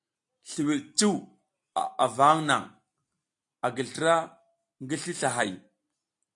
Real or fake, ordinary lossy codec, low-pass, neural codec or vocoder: real; AAC, 64 kbps; 10.8 kHz; none